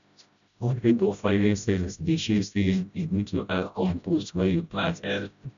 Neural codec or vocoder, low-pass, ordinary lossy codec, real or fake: codec, 16 kHz, 0.5 kbps, FreqCodec, smaller model; 7.2 kHz; none; fake